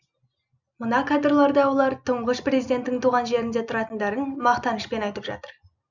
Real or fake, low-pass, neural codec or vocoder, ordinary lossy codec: real; 7.2 kHz; none; none